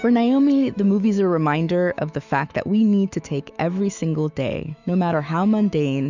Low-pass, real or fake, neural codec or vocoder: 7.2 kHz; fake; autoencoder, 48 kHz, 128 numbers a frame, DAC-VAE, trained on Japanese speech